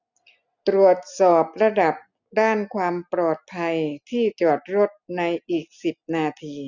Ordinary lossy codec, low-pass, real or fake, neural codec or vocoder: none; 7.2 kHz; real; none